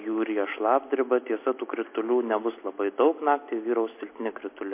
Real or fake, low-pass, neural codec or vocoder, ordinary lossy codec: real; 3.6 kHz; none; MP3, 24 kbps